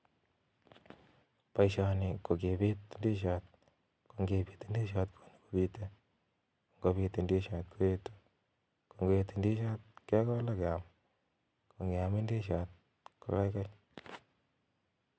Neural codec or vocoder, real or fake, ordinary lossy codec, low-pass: none; real; none; none